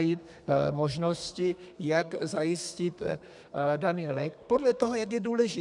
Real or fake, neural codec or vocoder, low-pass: fake; codec, 32 kHz, 1.9 kbps, SNAC; 10.8 kHz